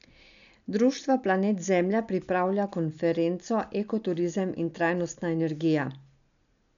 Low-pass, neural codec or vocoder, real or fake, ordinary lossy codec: 7.2 kHz; none; real; none